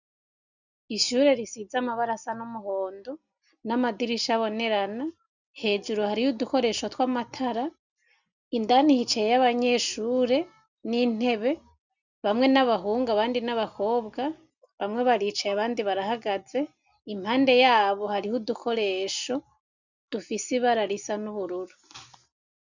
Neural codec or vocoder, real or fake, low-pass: none; real; 7.2 kHz